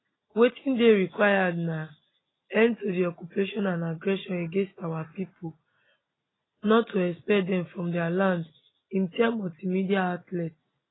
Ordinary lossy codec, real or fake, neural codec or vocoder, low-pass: AAC, 16 kbps; real; none; 7.2 kHz